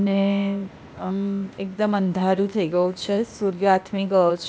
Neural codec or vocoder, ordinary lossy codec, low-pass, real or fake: codec, 16 kHz, 0.8 kbps, ZipCodec; none; none; fake